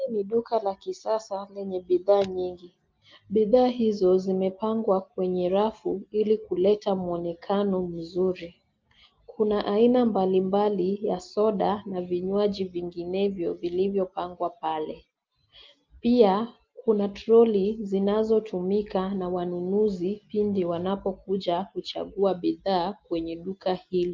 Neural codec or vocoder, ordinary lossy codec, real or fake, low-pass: none; Opus, 32 kbps; real; 7.2 kHz